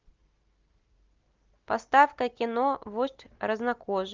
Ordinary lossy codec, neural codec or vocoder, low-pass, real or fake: Opus, 24 kbps; none; 7.2 kHz; real